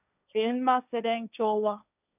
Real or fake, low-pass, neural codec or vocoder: fake; 3.6 kHz; codec, 16 kHz, 1.1 kbps, Voila-Tokenizer